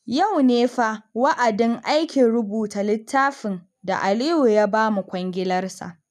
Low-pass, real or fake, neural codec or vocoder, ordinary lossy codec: none; real; none; none